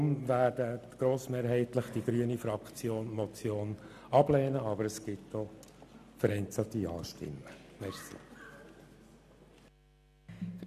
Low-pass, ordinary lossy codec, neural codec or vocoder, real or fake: 14.4 kHz; none; none; real